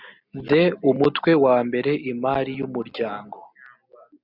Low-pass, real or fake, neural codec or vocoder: 5.4 kHz; real; none